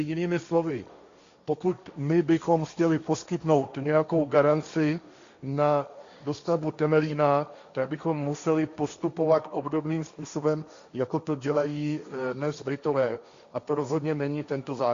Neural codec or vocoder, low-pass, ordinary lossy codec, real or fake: codec, 16 kHz, 1.1 kbps, Voila-Tokenizer; 7.2 kHz; Opus, 64 kbps; fake